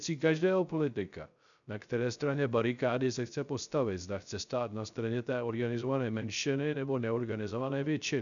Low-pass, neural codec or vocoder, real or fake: 7.2 kHz; codec, 16 kHz, 0.3 kbps, FocalCodec; fake